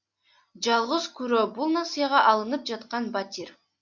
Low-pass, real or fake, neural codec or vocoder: 7.2 kHz; real; none